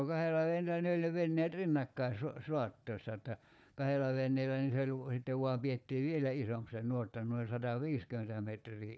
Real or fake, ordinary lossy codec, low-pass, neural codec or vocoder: fake; none; none; codec, 16 kHz, 4 kbps, FunCodec, trained on Chinese and English, 50 frames a second